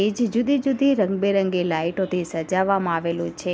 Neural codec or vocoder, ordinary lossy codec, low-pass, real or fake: none; none; none; real